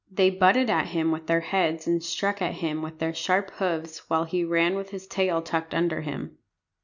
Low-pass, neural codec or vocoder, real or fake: 7.2 kHz; none; real